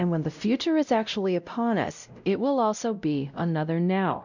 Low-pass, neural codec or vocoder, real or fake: 7.2 kHz; codec, 16 kHz, 0.5 kbps, X-Codec, WavLM features, trained on Multilingual LibriSpeech; fake